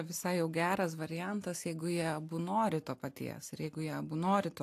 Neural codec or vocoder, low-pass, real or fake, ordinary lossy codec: none; 14.4 kHz; real; AAC, 64 kbps